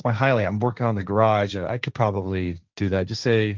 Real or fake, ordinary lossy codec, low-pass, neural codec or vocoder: fake; Opus, 32 kbps; 7.2 kHz; codec, 16 kHz, 1.1 kbps, Voila-Tokenizer